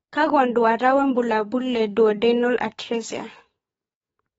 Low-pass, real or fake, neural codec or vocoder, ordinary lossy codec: 19.8 kHz; fake; codec, 44.1 kHz, 7.8 kbps, DAC; AAC, 24 kbps